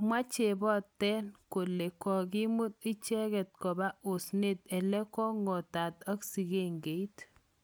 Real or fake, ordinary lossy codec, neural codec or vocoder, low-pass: real; none; none; none